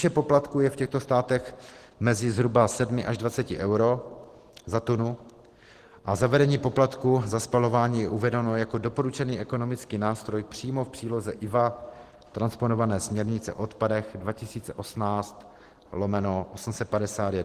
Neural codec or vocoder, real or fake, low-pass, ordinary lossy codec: none; real; 14.4 kHz; Opus, 16 kbps